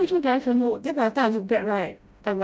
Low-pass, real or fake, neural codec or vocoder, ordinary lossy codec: none; fake; codec, 16 kHz, 0.5 kbps, FreqCodec, smaller model; none